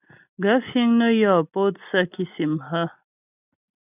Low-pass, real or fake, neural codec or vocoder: 3.6 kHz; real; none